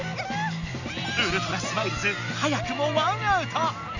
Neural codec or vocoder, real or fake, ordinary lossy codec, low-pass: none; real; none; 7.2 kHz